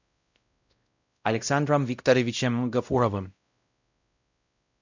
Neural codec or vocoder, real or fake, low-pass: codec, 16 kHz, 0.5 kbps, X-Codec, WavLM features, trained on Multilingual LibriSpeech; fake; 7.2 kHz